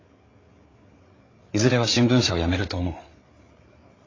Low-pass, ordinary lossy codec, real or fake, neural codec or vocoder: 7.2 kHz; AAC, 32 kbps; fake; codec, 16 kHz, 8 kbps, FreqCodec, larger model